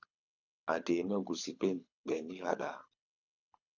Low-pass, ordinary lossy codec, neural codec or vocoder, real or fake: 7.2 kHz; AAC, 48 kbps; codec, 24 kHz, 6 kbps, HILCodec; fake